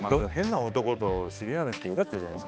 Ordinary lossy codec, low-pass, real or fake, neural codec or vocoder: none; none; fake; codec, 16 kHz, 2 kbps, X-Codec, HuBERT features, trained on balanced general audio